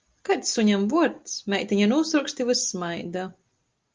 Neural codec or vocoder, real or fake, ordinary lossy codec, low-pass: none; real; Opus, 24 kbps; 7.2 kHz